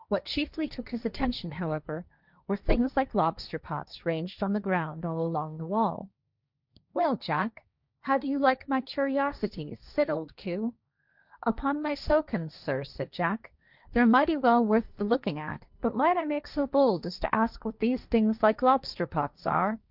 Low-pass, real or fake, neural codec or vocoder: 5.4 kHz; fake; codec, 16 kHz, 1.1 kbps, Voila-Tokenizer